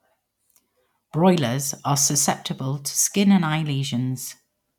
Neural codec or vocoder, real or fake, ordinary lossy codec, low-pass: none; real; none; 19.8 kHz